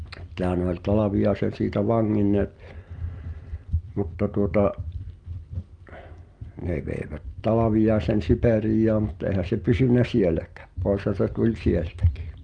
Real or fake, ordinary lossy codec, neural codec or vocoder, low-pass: real; Opus, 32 kbps; none; 9.9 kHz